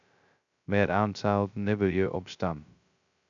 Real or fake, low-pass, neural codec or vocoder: fake; 7.2 kHz; codec, 16 kHz, 0.2 kbps, FocalCodec